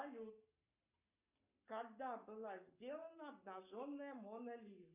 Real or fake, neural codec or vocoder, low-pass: fake; codec, 44.1 kHz, 7.8 kbps, Pupu-Codec; 3.6 kHz